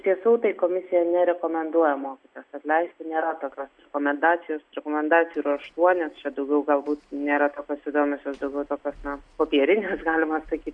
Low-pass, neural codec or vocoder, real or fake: 9.9 kHz; none; real